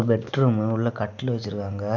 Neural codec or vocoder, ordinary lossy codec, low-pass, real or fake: none; none; 7.2 kHz; real